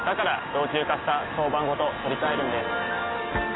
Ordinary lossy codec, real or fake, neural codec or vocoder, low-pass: AAC, 16 kbps; real; none; 7.2 kHz